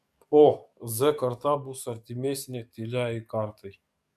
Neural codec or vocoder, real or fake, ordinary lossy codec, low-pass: codec, 44.1 kHz, 7.8 kbps, DAC; fake; MP3, 96 kbps; 14.4 kHz